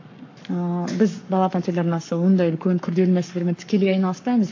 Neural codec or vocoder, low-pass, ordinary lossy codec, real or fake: codec, 44.1 kHz, 7.8 kbps, Pupu-Codec; 7.2 kHz; AAC, 48 kbps; fake